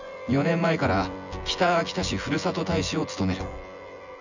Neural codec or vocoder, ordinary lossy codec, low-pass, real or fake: vocoder, 24 kHz, 100 mel bands, Vocos; none; 7.2 kHz; fake